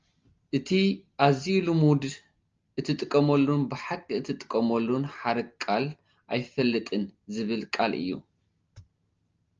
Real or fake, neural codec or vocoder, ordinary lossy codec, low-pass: real; none; Opus, 24 kbps; 7.2 kHz